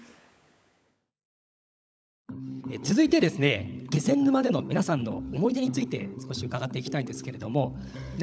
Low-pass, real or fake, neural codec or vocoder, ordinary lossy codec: none; fake; codec, 16 kHz, 16 kbps, FunCodec, trained on LibriTTS, 50 frames a second; none